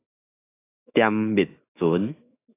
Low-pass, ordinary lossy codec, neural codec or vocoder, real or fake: 3.6 kHz; AAC, 16 kbps; autoencoder, 48 kHz, 128 numbers a frame, DAC-VAE, trained on Japanese speech; fake